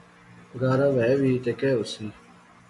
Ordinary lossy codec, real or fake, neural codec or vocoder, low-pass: MP3, 64 kbps; real; none; 10.8 kHz